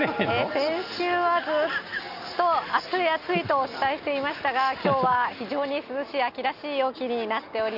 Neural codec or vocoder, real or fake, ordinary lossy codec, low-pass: none; real; none; 5.4 kHz